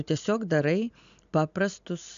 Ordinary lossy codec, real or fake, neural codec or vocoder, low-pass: AAC, 96 kbps; real; none; 7.2 kHz